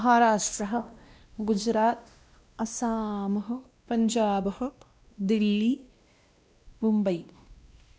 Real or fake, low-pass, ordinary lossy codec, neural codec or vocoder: fake; none; none; codec, 16 kHz, 1 kbps, X-Codec, WavLM features, trained on Multilingual LibriSpeech